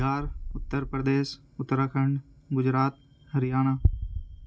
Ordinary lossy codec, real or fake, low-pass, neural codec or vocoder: none; real; none; none